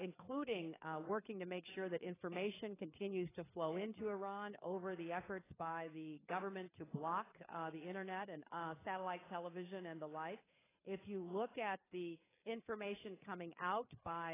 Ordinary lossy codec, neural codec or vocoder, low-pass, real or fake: AAC, 16 kbps; codec, 16 kHz, 4 kbps, FreqCodec, larger model; 3.6 kHz; fake